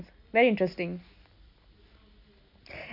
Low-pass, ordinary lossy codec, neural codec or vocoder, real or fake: 5.4 kHz; none; none; real